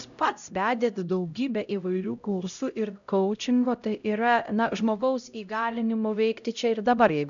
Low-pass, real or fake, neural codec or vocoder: 7.2 kHz; fake; codec, 16 kHz, 0.5 kbps, X-Codec, HuBERT features, trained on LibriSpeech